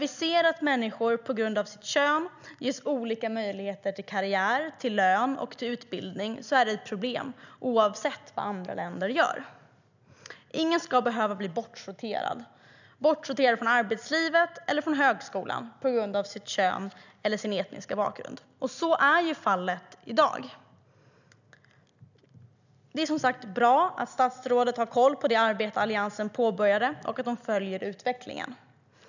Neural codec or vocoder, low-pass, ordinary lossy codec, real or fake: none; 7.2 kHz; none; real